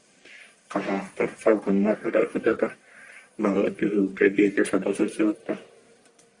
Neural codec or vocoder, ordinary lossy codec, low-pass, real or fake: codec, 44.1 kHz, 1.7 kbps, Pupu-Codec; Opus, 64 kbps; 10.8 kHz; fake